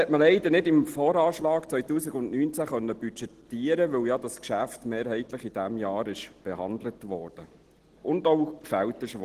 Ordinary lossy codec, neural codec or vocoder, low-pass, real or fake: Opus, 16 kbps; none; 14.4 kHz; real